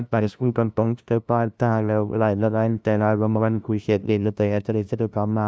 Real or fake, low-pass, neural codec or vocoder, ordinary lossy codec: fake; none; codec, 16 kHz, 0.5 kbps, FunCodec, trained on LibriTTS, 25 frames a second; none